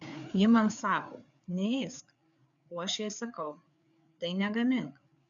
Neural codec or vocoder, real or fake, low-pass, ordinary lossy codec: codec, 16 kHz, 4 kbps, FreqCodec, larger model; fake; 7.2 kHz; MP3, 96 kbps